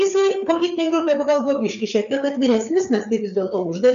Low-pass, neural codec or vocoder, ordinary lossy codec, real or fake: 7.2 kHz; codec, 16 kHz, 4 kbps, FreqCodec, larger model; MP3, 96 kbps; fake